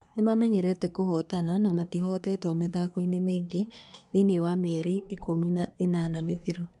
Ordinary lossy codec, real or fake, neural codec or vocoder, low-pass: none; fake; codec, 24 kHz, 1 kbps, SNAC; 10.8 kHz